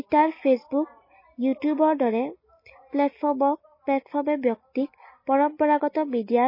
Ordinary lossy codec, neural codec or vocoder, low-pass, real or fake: MP3, 24 kbps; none; 5.4 kHz; real